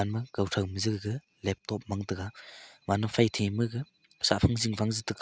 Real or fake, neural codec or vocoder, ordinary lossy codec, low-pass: real; none; none; none